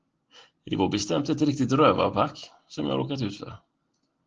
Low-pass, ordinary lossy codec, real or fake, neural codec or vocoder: 7.2 kHz; Opus, 16 kbps; real; none